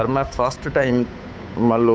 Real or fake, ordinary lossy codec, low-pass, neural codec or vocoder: fake; none; none; codec, 16 kHz, 8 kbps, FunCodec, trained on Chinese and English, 25 frames a second